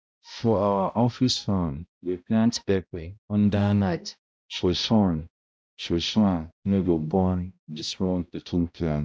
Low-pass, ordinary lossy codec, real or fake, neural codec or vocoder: none; none; fake; codec, 16 kHz, 0.5 kbps, X-Codec, HuBERT features, trained on balanced general audio